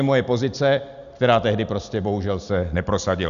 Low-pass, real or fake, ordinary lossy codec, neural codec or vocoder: 7.2 kHz; real; Opus, 64 kbps; none